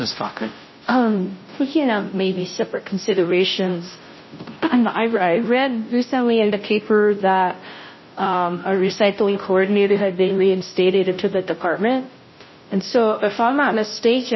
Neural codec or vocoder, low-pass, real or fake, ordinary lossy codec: codec, 16 kHz, 0.5 kbps, FunCodec, trained on Chinese and English, 25 frames a second; 7.2 kHz; fake; MP3, 24 kbps